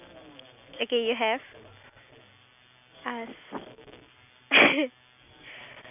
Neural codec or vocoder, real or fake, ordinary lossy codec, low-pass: none; real; none; 3.6 kHz